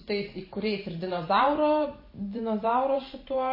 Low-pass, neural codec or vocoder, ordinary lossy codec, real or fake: 5.4 kHz; vocoder, 44.1 kHz, 128 mel bands every 512 samples, BigVGAN v2; MP3, 24 kbps; fake